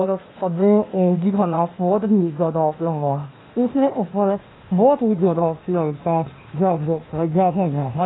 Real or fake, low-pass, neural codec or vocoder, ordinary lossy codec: fake; 7.2 kHz; codec, 16 kHz, 0.8 kbps, ZipCodec; AAC, 16 kbps